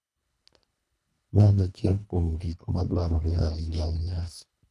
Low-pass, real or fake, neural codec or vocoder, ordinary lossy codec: none; fake; codec, 24 kHz, 1.5 kbps, HILCodec; none